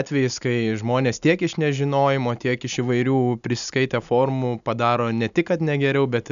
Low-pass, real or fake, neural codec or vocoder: 7.2 kHz; real; none